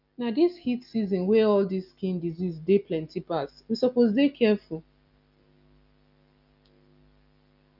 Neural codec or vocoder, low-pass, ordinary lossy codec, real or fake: none; 5.4 kHz; none; real